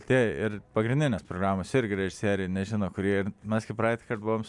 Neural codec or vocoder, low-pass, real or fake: none; 10.8 kHz; real